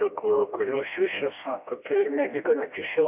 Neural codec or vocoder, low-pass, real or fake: codec, 16 kHz, 1 kbps, FreqCodec, smaller model; 3.6 kHz; fake